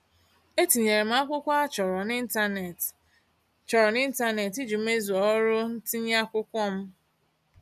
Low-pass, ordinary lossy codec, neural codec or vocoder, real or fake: 14.4 kHz; none; none; real